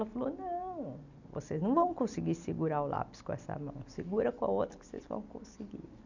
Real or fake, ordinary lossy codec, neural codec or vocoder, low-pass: real; none; none; 7.2 kHz